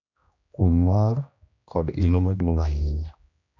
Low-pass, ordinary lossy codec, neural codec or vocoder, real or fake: 7.2 kHz; none; codec, 16 kHz, 1 kbps, X-Codec, HuBERT features, trained on general audio; fake